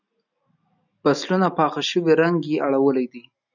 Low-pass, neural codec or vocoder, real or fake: 7.2 kHz; none; real